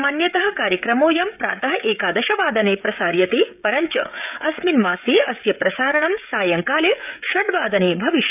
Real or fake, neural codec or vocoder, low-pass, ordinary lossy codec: fake; codec, 16 kHz, 16 kbps, FreqCodec, smaller model; 3.6 kHz; none